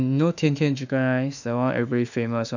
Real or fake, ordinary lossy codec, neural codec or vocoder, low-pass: fake; none; autoencoder, 48 kHz, 32 numbers a frame, DAC-VAE, trained on Japanese speech; 7.2 kHz